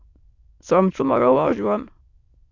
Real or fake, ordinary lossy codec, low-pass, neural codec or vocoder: fake; AAC, 48 kbps; 7.2 kHz; autoencoder, 22.05 kHz, a latent of 192 numbers a frame, VITS, trained on many speakers